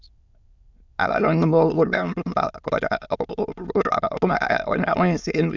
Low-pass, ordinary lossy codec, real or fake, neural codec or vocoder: 7.2 kHz; Opus, 64 kbps; fake; autoencoder, 22.05 kHz, a latent of 192 numbers a frame, VITS, trained on many speakers